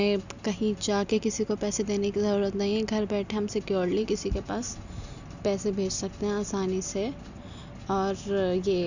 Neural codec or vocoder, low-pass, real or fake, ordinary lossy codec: none; 7.2 kHz; real; none